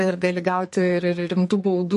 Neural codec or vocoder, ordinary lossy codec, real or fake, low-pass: codec, 44.1 kHz, 2.6 kbps, SNAC; MP3, 48 kbps; fake; 14.4 kHz